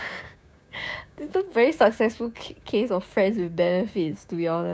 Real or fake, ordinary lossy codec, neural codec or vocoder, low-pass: fake; none; codec, 16 kHz, 6 kbps, DAC; none